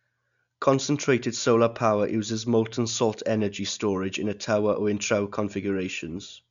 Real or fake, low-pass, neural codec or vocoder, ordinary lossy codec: real; 7.2 kHz; none; none